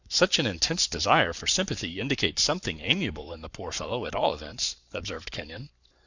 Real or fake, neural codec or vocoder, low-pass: fake; codec, 44.1 kHz, 7.8 kbps, Pupu-Codec; 7.2 kHz